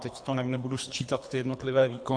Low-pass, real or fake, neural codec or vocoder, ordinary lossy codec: 9.9 kHz; fake; codec, 24 kHz, 3 kbps, HILCodec; AAC, 64 kbps